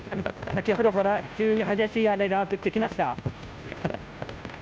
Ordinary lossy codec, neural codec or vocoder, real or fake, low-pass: none; codec, 16 kHz, 0.5 kbps, FunCodec, trained on Chinese and English, 25 frames a second; fake; none